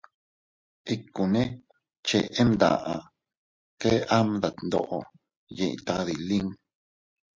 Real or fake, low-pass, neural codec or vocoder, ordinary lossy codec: real; 7.2 kHz; none; MP3, 48 kbps